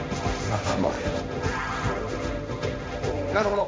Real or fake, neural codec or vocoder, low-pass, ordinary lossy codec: fake; codec, 16 kHz, 1.1 kbps, Voila-Tokenizer; none; none